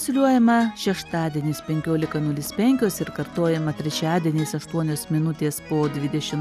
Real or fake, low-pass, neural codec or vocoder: real; 14.4 kHz; none